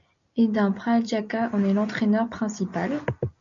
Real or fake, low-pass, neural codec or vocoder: real; 7.2 kHz; none